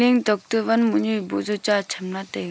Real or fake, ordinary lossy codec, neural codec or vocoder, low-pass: real; none; none; none